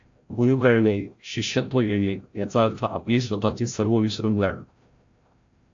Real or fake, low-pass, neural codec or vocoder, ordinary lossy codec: fake; 7.2 kHz; codec, 16 kHz, 0.5 kbps, FreqCodec, larger model; AAC, 48 kbps